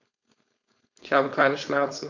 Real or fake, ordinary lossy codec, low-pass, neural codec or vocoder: fake; none; 7.2 kHz; codec, 16 kHz, 4.8 kbps, FACodec